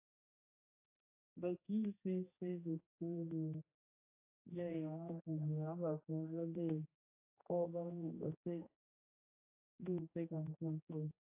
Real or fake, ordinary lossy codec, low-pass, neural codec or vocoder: fake; AAC, 32 kbps; 3.6 kHz; codec, 16 kHz, 2 kbps, X-Codec, HuBERT features, trained on general audio